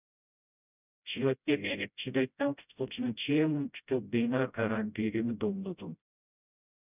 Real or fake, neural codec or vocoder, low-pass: fake; codec, 16 kHz, 0.5 kbps, FreqCodec, smaller model; 3.6 kHz